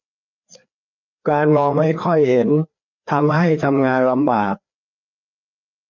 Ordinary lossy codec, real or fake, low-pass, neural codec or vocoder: none; fake; 7.2 kHz; codec, 16 kHz, 2 kbps, FreqCodec, larger model